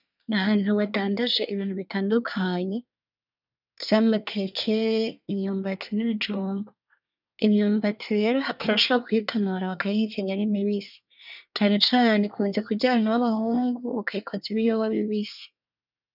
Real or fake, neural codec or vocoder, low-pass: fake; codec, 24 kHz, 1 kbps, SNAC; 5.4 kHz